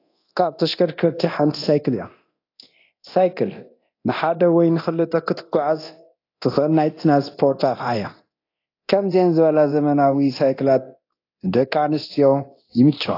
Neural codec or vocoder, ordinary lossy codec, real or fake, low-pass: codec, 24 kHz, 0.9 kbps, DualCodec; AAC, 32 kbps; fake; 5.4 kHz